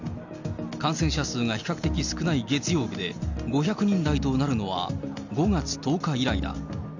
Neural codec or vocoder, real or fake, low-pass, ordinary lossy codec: none; real; 7.2 kHz; none